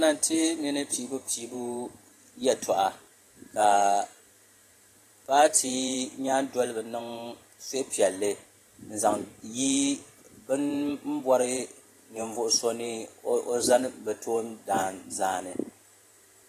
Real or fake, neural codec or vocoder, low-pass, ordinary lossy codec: fake; vocoder, 44.1 kHz, 128 mel bands every 512 samples, BigVGAN v2; 14.4 kHz; AAC, 48 kbps